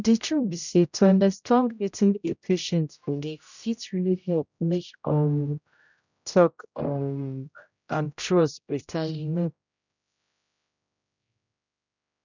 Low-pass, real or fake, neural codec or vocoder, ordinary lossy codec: 7.2 kHz; fake; codec, 16 kHz, 0.5 kbps, X-Codec, HuBERT features, trained on general audio; none